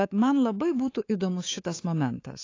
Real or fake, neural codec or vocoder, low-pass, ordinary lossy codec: fake; codec, 24 kHz, 3.1 kbps, DualCodec; 7.2 kHz; AAC, 32 kbps